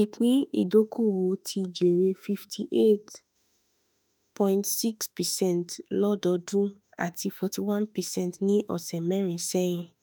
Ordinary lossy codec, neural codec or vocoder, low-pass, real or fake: none; autoencoder, 48 kHz, 32 numbers a frame, DAC-VAE, trained on Japanese speech; none; fake